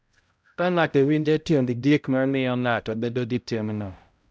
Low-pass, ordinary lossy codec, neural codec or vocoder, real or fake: none; none; codec, 16 kHz, 0.5 kbps, X-Codec, HuBERT features, trained on balanced general audio; fake